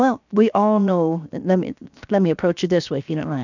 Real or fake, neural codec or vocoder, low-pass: fake; codec, 16 kHz, 0.7 kbps, FocalCodec; 7.2 kHz